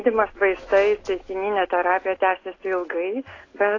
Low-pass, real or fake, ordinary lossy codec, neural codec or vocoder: 7.2 kHz; real; AAC, 32 kbps; none